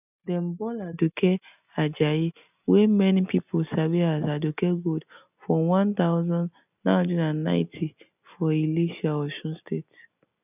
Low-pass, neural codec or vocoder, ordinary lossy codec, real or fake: 3.6 kHz; none; none; real